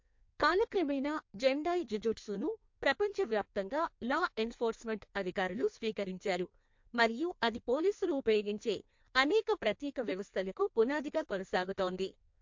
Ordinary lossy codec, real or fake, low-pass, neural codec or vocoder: MP3, 48 kbps; fake; 7.2 kHz; codec, 16 kHz in and 24 kHz out, 1.1 kbps, FireRedTTS-2 codec